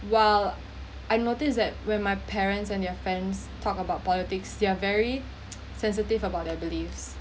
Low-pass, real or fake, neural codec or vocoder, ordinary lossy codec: none; real; none; none